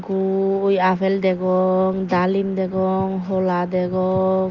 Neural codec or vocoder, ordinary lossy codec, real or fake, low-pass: none; Opus, 32 kbps; real; 7.2 kHz